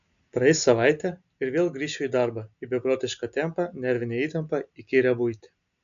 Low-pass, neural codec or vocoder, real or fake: 7.2 kHz; none; real